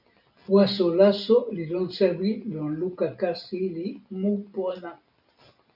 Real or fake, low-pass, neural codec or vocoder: real; 5.4 kHz; none